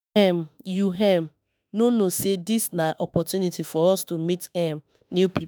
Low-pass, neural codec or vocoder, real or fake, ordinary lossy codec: none; autoencoder, 48 kHz, 32 numbers a frame, DAC-VAE, trained on Japanese speech; fake; none